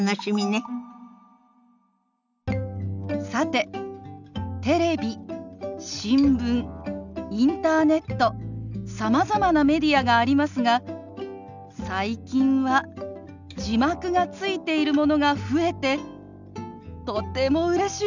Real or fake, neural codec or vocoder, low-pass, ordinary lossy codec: real; none; 7.2 kHz; none